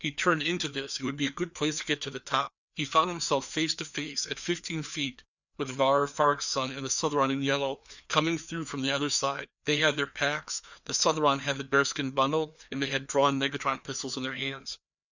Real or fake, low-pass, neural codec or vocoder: fake; 7.2 kHz; codec, 16 kHz, 2 kbps, FreqCodec, larger model